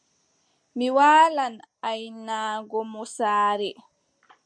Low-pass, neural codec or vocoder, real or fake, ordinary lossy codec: 9.9 kHz; none; real; MP3, 64 kbps